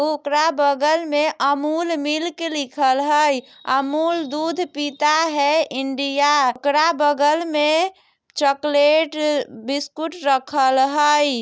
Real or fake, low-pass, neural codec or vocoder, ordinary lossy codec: real; none; none; none